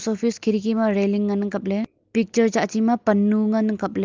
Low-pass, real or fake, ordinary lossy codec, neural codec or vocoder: 7.2 kHz; real; Opus, 24 kbps; none